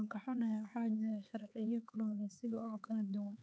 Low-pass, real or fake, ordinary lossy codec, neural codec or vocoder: none; fake; none; codec, 16 kHz, 4 kbps, X-Codec, HuBERT features, trained on LibriSpeech